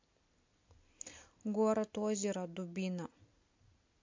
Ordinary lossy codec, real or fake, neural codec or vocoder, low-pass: MP3, 48 kbps; real; none; 7.2 kHz